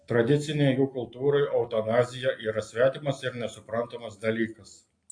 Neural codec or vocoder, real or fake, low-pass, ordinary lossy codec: none; real; 9.9 kHz; AAC, 48 kbps